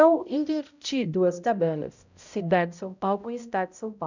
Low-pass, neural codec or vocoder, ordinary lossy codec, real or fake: 7.2 kHz; codec, 16 kHz, 0.5 kbps, X-Codec, HuBERT features, trained on balanced general audio; none; fake